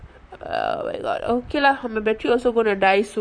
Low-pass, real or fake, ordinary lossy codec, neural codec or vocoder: 9.9 kHz; fake; none; vocoder, 44.1 kHz, 128 mel bands, Pupu-Vocoder